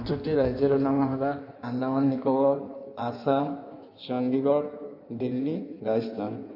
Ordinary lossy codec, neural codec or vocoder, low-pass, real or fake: none; codec, 16 kHz in and 24 kHz out, 1.1 kbps, FireRedTTS-2 codec; 5.4 kHz; fake